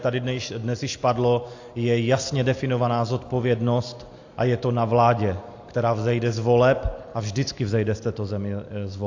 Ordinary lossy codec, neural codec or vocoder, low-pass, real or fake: AAC, 48 kbps; none; 7.2 kHz; real